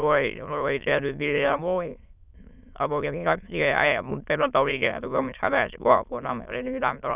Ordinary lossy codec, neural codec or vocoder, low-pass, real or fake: none; autoencoder, 22.05 kHz, a latent of 192 numbers a frame, VITS, trained on many speakers; 3.6 kHz; fake